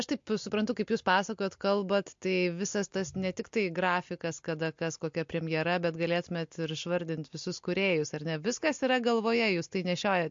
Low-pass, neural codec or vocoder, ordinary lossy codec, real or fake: 7.2 kHz; none; MP3, 48 kbps; real